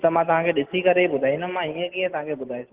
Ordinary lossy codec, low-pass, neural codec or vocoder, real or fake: Opus, 16 kbps; 3.6 kHz; none; real